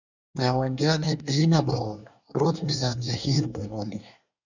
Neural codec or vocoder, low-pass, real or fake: codec, 24 kHz, 1 kbps, SNAC; 7.2 kHz; fake